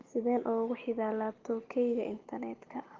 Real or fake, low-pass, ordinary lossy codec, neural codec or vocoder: real; 7.2 kHz; Opus, 16 kbps; none